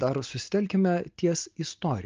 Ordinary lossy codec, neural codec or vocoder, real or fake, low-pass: Opus, 24 kbps; none; real; 7.2 kHz